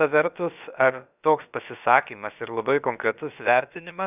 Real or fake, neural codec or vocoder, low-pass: fake; codec, 16 kHz, about 1 kbps, DyCAST, with the encoder's durations; 3.6 kHz